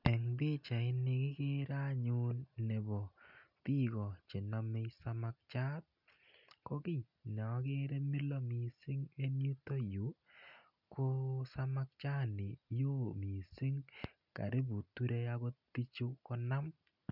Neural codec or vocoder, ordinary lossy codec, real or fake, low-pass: none; none; real; 5.4 kHz